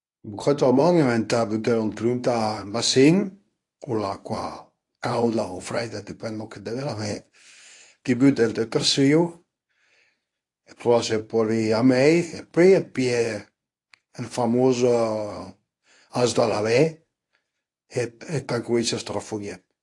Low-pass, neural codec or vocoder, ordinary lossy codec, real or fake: 10.8 kHz; codec, 24 kHz, 0.9 kbps, WavTokenizer, medium speech release version 1; AAC, 48 kbps; fake